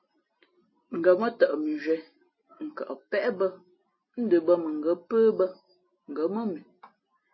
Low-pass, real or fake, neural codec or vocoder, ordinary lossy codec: 7.2 kHz; real; none; MP3, 24 kbps